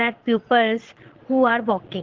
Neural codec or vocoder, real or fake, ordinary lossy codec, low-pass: vocoder, 22.05 kHz, 80 mel bands, Vocos; fake; Opus, 16 kbps; 7.2 kHz